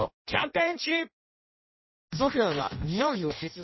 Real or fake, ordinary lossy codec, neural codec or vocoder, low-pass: fake; MP3, 24 kbps; codec, 16 kHz in and 24 kHz out, 0.6 kbps, FireRedTTS-2 codec; 7.2 kHz